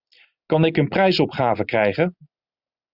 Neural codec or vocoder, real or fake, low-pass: none; real; 5.4 kHz